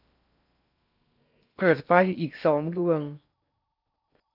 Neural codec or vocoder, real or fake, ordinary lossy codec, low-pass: codec, 16 kHz in and 24 kHz out, 0.6 kbps, FocalCodec, streaming, 4096 codes; fake; none; 5.4 kHz